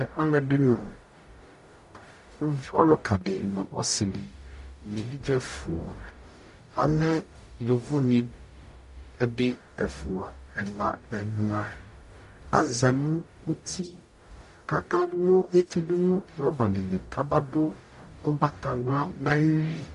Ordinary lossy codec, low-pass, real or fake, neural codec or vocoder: MP3, 48 kbps; 14.4 kHz; fake; codec, 44.1 kHz, 0.9 kbps, DAC